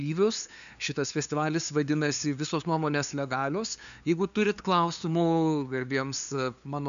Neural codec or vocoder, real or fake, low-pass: codec, 16 kHz, 2 kbps, FunCodec, trained on LibriTTS, 25 frames a second; fake; 7.2 kHz